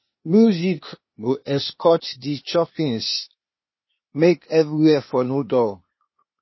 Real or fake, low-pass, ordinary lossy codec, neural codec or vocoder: fake; 7.2 kHz; MP3, 24 kbps; codec, 16 kHz, 0.8 kbps, ZipCodec